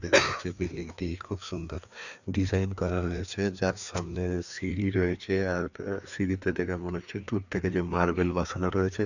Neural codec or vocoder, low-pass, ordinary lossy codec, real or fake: codec, 16 kHz, 2 kbps, FreqCodec, larger model; 7.2 kHz; none; fake